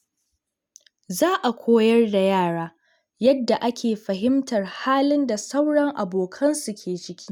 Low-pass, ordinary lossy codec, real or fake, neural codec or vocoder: none; none; real; none